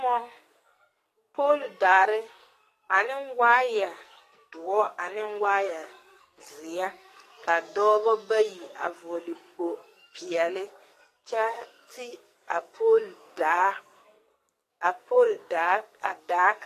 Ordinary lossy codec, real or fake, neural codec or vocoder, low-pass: AAC, 48 kbps; fake; codec, 44.1 kHz, 2.6 kbps, SNAC; 14.4 kHz